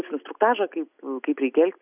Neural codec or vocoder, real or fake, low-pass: none; real; 3.6 kHz